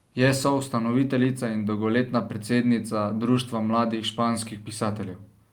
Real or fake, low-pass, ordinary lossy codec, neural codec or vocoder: real; 19.8 kHz; Opus, 32 kbps; none